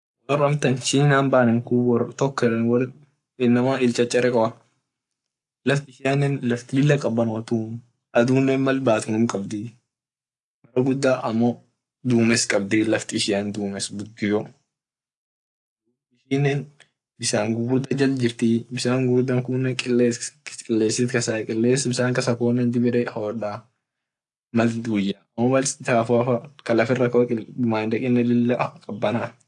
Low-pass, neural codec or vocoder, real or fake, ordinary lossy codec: 10.8 kHz; codec, 44.1 kHz, 7.8 kbps, Pupu-Codec; fake; AAC, 64 kbps